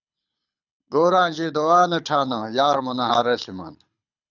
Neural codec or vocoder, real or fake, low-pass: codec, 24 kHz, 6 kbps, HILCodec; fake; 7.2 kHz